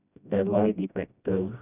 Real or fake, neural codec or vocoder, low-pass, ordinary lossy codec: fake; codec, 16 kHz, 1 kbps, FreqCodec, smaller model; 3.6 kHz; none